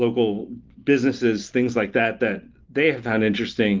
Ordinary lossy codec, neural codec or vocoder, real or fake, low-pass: Opus, 32 kbps; none; real; 7.2 kHz